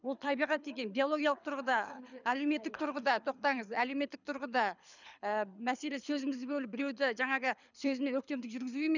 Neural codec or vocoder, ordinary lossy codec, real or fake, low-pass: codec, 24 kHz, 6 kbps, HILCodec; none; fake; 7.2 kHz